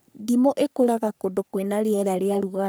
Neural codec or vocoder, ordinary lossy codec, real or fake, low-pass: codec, 44.1 kHz, 3.4 kbps, Pupu-Codec; none; fake; none